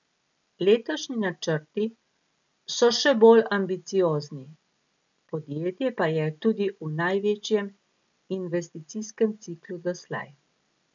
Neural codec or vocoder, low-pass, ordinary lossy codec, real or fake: none; 7.2 kHz; none; real